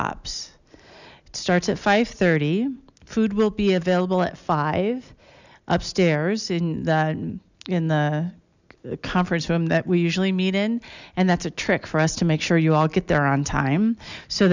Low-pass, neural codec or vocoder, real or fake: 7.2 kHz; none; real